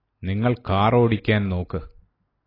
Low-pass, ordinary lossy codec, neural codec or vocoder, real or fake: 5.4 kHz; AAC, 24 kbps; none; real